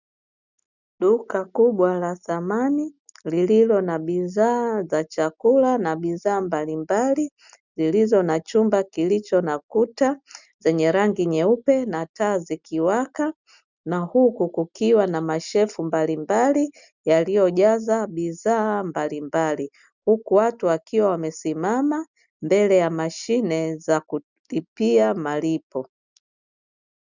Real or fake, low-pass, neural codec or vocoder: real; 7.2 kHz; none